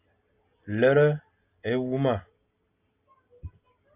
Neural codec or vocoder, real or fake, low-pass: none; real; 3.6 kHz